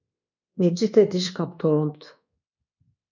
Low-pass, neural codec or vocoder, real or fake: 7.2 kHz; codec, 24 kHz, 1.2 kbps, DualCodec; fake